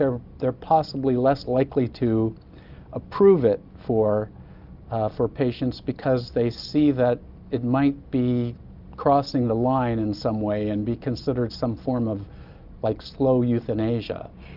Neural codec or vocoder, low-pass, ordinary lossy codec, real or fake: none; 5.4 kHz; Opus, 24 kbps; real